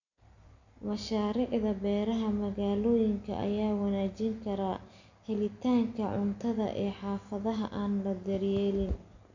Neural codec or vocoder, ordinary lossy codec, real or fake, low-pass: none; AAC, 48 kbps; real; 7.2 kHz